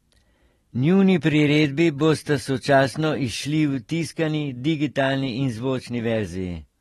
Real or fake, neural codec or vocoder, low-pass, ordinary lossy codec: real; none; 19.8 kHz; AAC, 32 kbps